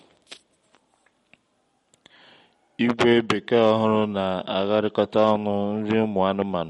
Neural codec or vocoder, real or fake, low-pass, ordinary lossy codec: none; real; 19.8 kHz; MP3, 48 kbps